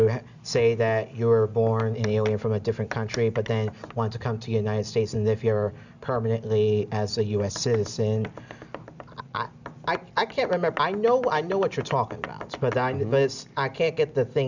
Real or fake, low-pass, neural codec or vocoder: real; 7.2 kHz; none